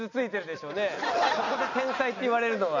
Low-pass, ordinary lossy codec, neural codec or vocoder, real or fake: 7.2 kHz; none; none; real